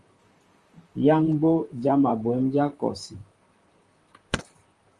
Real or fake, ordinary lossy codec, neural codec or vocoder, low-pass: fake; Opus, 32 kbps; vocoder, 44.1 kHz, 128 mel bands, Pupu-Vocoder; 10.8 kHz